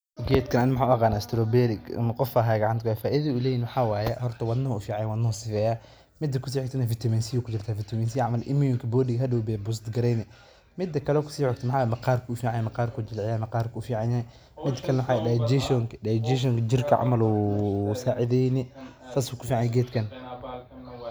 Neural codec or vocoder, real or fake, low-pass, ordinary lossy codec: none; real; none; none